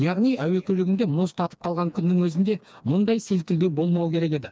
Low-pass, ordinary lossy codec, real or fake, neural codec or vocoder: none; none; fake; codec, 16 kHz, 2 kbps, FreqCodec, smaller model